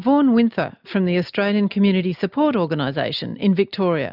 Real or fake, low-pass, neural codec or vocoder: real; 5.4 kHz; none